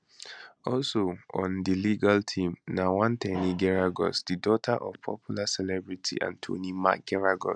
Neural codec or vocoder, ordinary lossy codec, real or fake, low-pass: none; none; real; 9.9 kHz